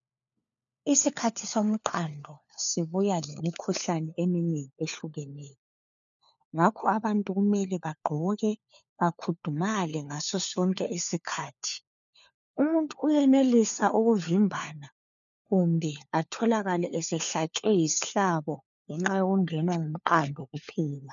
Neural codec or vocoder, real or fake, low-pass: codec, 16 kHz, 4 kbps, FunCodec, trained on LibriTTS, 50 frames a second; fake; 7.2 kHz